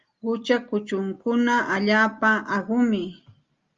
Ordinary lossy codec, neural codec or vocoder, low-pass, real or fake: Opus, 32 kbps; none; 7.2 kHz; real